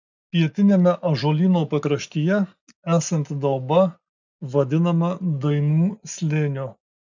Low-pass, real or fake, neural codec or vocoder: 7.2 kHz; real; none